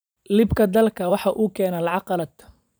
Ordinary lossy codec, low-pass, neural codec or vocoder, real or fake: none; none; vocoder, 44.1 kHz, 128 mel bands every 512 samples, BigVGAN v2; fake